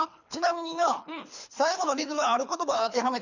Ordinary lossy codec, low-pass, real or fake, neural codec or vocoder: none; 7.2 kHz; fake; codec, 24 kHz, 3 kbps, HILCodec